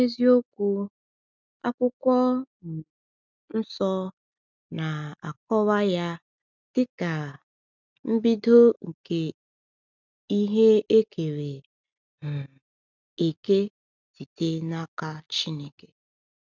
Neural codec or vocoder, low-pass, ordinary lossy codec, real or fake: none; 7.2 kHz; none; real